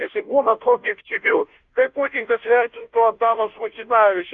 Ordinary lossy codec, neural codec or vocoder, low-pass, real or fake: Opus, 64 kbps; codec, 16 kHz, 0.5 kbps, FunCodec, trained on Chinese and English, 25 frames a second; 7.2 kHz; fake